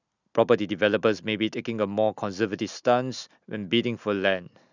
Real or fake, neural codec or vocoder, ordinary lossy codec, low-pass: real; none; none; 7.2 kHz